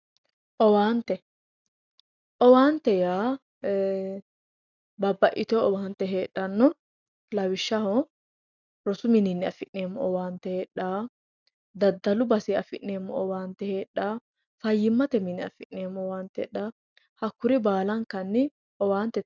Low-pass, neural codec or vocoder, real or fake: 7.2 kHz; none; real